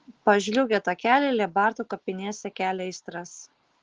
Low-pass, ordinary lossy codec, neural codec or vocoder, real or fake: 7.2 kHz; Opus, 16 kbps; none; real